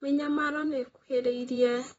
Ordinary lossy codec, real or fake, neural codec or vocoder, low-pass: AAC, 24 kbps; fake; vocoder, 44.1 kHz, 128 mel bands, Pupu-Vocoder; 19.8 kHz